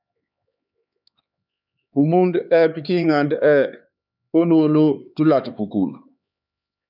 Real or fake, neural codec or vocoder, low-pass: fake; codec, 16 kHz, 4 kbps, X-Codec, HuBERT features, trained on LibriSpeech; 5.4 kHz